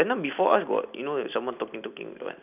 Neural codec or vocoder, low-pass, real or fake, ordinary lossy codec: none; 3.6 kHz; real; none